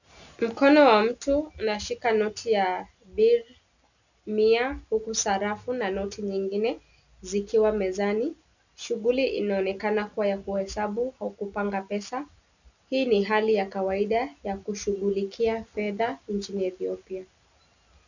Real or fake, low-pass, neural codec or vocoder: real; 7.2 kHz; none